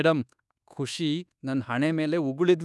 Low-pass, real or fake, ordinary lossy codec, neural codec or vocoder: none; fake; none; codec, 24 kHz, 1.2 kbps, DualCodec